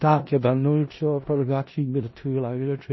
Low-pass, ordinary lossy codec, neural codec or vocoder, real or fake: 7.2 kHz; MP3, 24 kbps; codec, 16 kHz in and 24 kHz out, 0.4 kbps, LongCat-Audio-Codec, four codebook decoder; fake